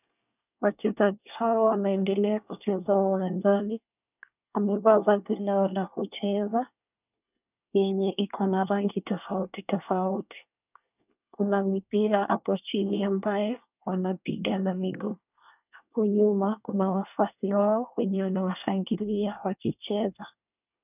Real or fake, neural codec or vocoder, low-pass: fake; codec, 24 kHz, 1 kbps, SNAC; 3.6 kHz